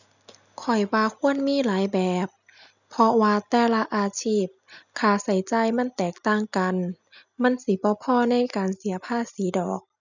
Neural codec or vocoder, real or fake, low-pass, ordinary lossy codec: none; real; 7.2 kHz; none